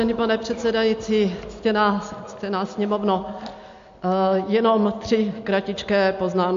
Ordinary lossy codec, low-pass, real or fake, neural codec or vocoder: MP3, 48 kbps; 7.2 kHz; real; none